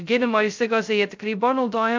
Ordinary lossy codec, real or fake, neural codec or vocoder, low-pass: MP3, 64 kbps; fake; codec, 16 kHz, 0.2 kbps, FocalCodec; 7.2 kHz